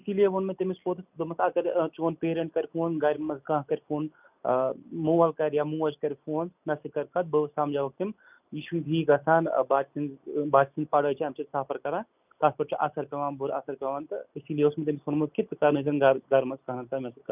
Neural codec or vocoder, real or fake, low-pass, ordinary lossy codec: none; real; 3.6 kHz; none